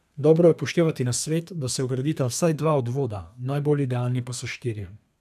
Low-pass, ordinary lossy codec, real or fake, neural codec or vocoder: 14.4 kHz; none; fake; codec, 32 kHz, 1.9 kbps, SNAC